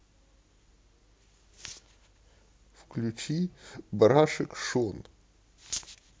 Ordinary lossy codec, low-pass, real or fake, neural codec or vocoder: none; none; real; none